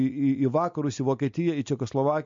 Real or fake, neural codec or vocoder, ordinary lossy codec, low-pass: real; none; MP3, 48 kbps; 7.2 kHz